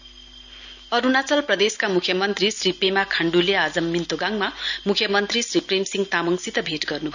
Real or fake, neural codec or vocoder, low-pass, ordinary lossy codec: real; none; 7.2 kHz; none